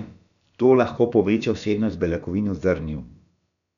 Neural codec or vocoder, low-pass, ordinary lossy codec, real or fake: codec, 16 kHz, about 1 kbps, DyCAST, with the encoder's durations; 7.2 kHz; none; fake